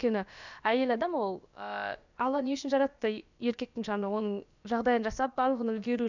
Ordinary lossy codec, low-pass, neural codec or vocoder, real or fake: none; 7.2 kHz; codec, 16 kHz, about 1 kbps, DyCAST, with the encoder's durations; fake